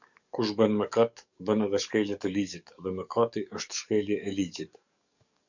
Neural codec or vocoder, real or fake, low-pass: codec, 44.1 kHz, 7.8 kbps, DAC; fake; 7.2 kHz